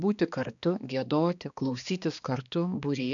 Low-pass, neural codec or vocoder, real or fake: 7.2 kHz; codec, 16 kHz, 2 kbps, X-Codec, HuBERT features, trained on balanced general audio; fake